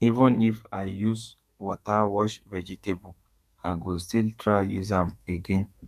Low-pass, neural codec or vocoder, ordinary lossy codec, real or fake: 14.4 kHz; codec, 32 kHz, 1.9 kbps, SNAC; none; fake